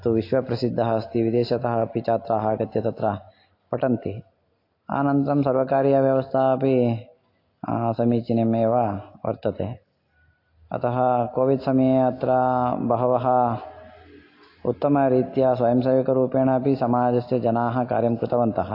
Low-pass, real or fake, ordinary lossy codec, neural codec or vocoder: 5.4 kHz; real; AAC, 32 kbps; none